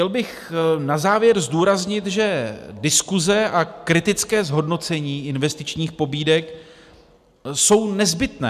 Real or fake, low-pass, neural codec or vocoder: real; 14.4 kHz; none